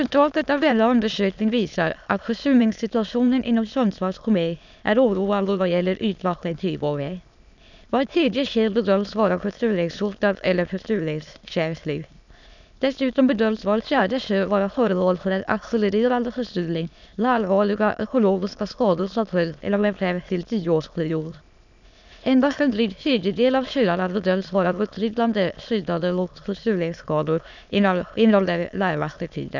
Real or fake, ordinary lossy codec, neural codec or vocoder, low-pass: fake; none; autoencoder, 22.05 kHz, a latent of 192 numbers a frame, VITS, trained on many speakers; 7.2 kHz